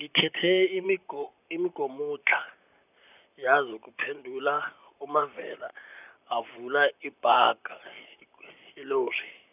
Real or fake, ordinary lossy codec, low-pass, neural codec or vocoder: fake; none; 3.6 kHz; vocoder, 44.1 kHz, 128 mel bands, Pupu-Vocoder